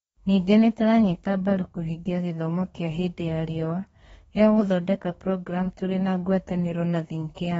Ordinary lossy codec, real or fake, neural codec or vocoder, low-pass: AAC, 24 kbps; fake; codec, 32 kHz, 1.9 kbps, SNAC; 14.4 kHz